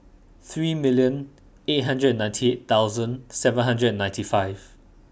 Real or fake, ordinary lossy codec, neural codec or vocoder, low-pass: real; none; none; none